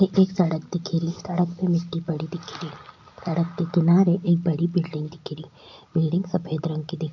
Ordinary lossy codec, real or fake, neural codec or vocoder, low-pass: none; real; none; 7.2 kHz